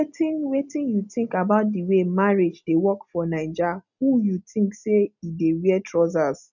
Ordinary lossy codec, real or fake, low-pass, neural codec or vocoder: none; real; 7.2 kHz; none